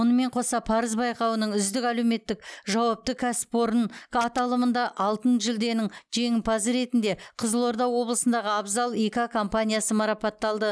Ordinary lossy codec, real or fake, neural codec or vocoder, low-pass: none; real; none; none